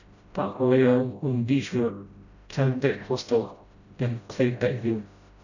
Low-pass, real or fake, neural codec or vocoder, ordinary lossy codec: 7.2 kHz; fake; codec, 16 kHz, 0.5 kbps, FreqCodec, smaller model; none